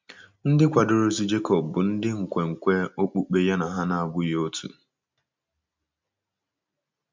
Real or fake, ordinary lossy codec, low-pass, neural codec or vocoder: real; MP3, 64 kbps; 7.2 kHz; none